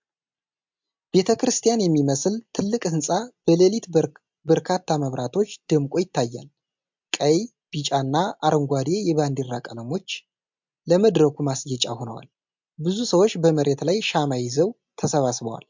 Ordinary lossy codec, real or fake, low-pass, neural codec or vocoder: MP3, 64 kbps; real; 7.2 kHz; none